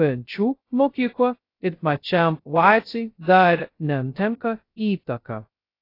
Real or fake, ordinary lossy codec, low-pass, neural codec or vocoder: fake; AAC, 32 kbps; 5.4 kHz; codec, 16 kHz, 0.2 kbps, FocalCodec